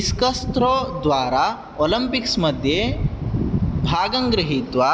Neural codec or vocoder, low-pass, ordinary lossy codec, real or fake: none; none; none; real